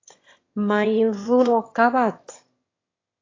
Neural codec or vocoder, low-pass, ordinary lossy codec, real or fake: autoencoder, 22.05 kHz, a latent of 192 numbers a frame, VITS, trained on one speaker; 7.2 kHz; AAC, 32 kbps; fake